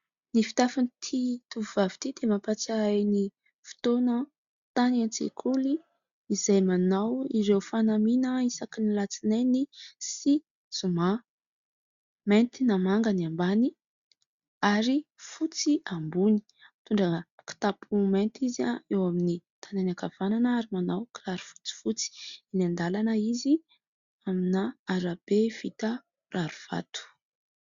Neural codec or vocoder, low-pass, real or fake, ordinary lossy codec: none; 7.2 kHz; real; Opus, 64 kbps